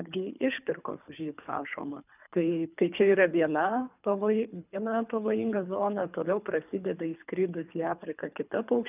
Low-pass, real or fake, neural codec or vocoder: 3.6 kHz; fake; codec, 24 kHz, 3 kbps, HILCodec